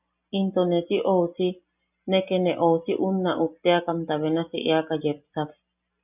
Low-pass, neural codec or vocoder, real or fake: 3.6 kHz; none; real